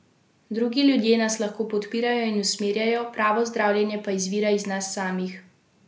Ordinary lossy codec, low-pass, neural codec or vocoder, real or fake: none; none; none; real